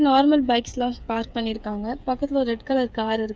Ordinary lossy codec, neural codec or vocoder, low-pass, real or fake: none; codec, 16 kHz, 8 kbps, FreqCodec, smaller model; none; fake